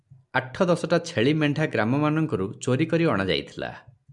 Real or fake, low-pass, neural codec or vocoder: real; 10.8 kHz; none